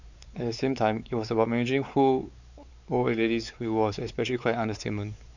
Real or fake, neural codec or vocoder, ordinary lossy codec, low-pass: fake; codec, 16 kHz, 4 kbps, X-Codec, WavLM features, trained on Multilingual LibriSpeech; none; 7.2 kHz